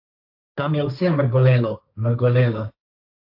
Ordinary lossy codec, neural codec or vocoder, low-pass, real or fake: AAC, 48 kbps; codec, 16 kHz, 1.1 kbps, Voila-Tokenizer; 5.4 kHz; fake